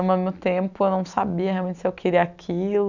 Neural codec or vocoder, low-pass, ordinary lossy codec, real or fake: none; 7.2 kHz; none; real